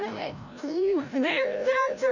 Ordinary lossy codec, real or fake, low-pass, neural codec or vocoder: none; fake; 7.2 kHz; codec, 16 kHz, 0.5 kbps, FreqCodec, larger model